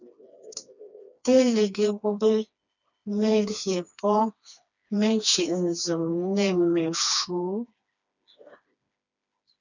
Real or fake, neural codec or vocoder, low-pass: fake; codec, 16 kHz, 2 kbps, FreqCodec, smaller model; 7.2 kHz